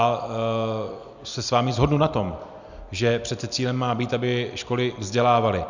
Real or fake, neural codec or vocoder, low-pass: real; none; 7.2 kHz